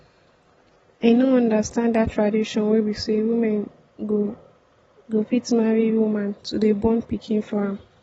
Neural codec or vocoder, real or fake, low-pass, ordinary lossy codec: none; real; 19.8 kHz; AAC, 24 kbps